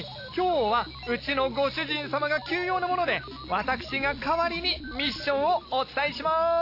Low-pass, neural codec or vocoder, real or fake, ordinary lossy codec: 5.4 kHz; none; real; AAC, 32 kbps